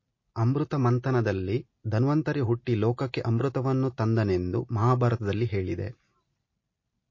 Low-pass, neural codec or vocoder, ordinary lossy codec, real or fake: 7.2 kHz; none; MP3, 32 kbps; real